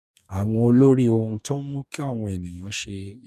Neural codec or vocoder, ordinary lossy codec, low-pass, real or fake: codec, 44.1 kHz, 2.6 kbps, DAC; none; 14.4 kHz; fake